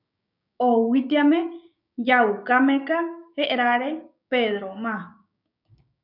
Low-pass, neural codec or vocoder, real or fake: 5.4 kHz; codec, 16 kHz, 6 kbps, DAC; fake